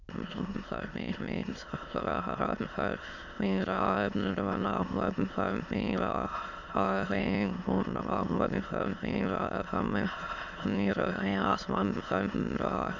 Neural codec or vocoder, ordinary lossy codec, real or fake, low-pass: autoencoder, 22.05 kHz, a latent of 192 numbers a frame, VITS, trained on many speakers; none; fake; 7.2 kHz